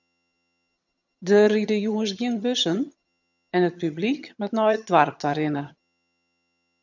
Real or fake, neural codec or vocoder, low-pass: fake; vocoder, 22.05 kHz, 80 mel bands, HiFi-GAN; 7.2 kHz